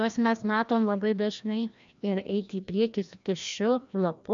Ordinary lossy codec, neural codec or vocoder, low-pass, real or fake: MP3, 64 kbps; codec, 16 kHz, 1 kbps, FreqCodec, larger model; 7.2 kHz; fake